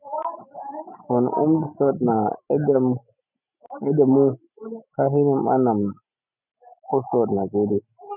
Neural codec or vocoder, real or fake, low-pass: none; real; 3.6 kHz